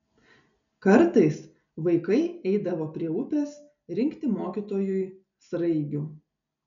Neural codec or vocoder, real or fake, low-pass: none; real; 7.2 kHz